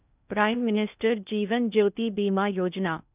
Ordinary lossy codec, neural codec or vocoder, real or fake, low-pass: none; codec, 16 kHz in and 24 kHz out, 0.6 kbps, FocalCodec, streaming, 2048 codes; fake; 3.6 kHz